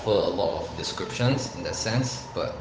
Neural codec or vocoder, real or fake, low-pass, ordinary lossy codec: codec, 16 kHz, 8 kbps, FunCodec, trained on Chinese and English, 25 frames a second; fake; none; none